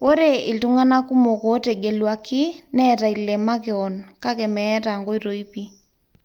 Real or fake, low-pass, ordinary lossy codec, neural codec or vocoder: real; 19.8 kHz; Opus, 32 kbps; none